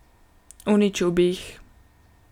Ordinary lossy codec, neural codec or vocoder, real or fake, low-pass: none; none; real; 19.8 kHz